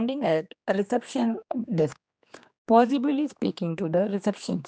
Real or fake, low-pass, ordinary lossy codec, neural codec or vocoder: fake; none; none; codec, 16 kHz, 2 kbps, X-Codec, HuBERT features, trained on general audio